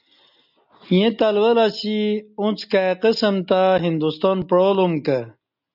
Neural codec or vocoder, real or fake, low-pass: none; real; 5.4 kHz